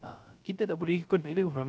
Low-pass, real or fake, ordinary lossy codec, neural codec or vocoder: none; fake; none; codec, 16 kHz, 0.3 kbps, FocalCodec